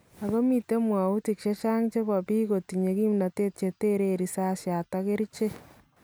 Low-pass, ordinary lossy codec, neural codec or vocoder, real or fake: none; none; none; real